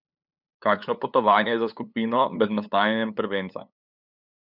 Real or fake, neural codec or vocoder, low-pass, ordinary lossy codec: fake; codec, 16 kHz, 8 kbps, FunCodec, trained on LibriTTS, 25 frames a second; 5.4 kHz; none